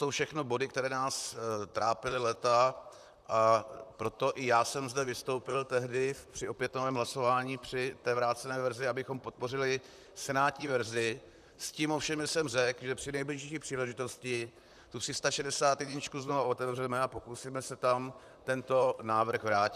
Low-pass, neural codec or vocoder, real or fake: 14.4 kHz; vocoder, 44.1 kHz, 128 mel bands, Pupu-Vocoder; fake